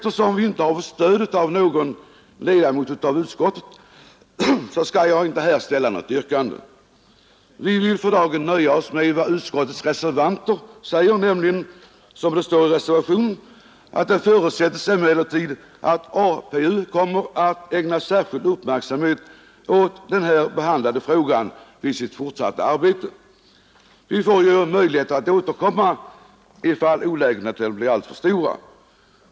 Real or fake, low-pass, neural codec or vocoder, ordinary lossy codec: real; none; none; none